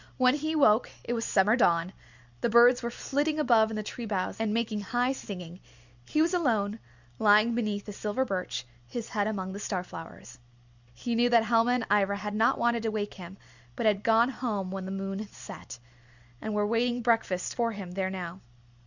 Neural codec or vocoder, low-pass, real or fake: none; 7.2 kHz; real